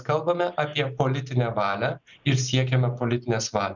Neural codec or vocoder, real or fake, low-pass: none; real; 7.2 kHz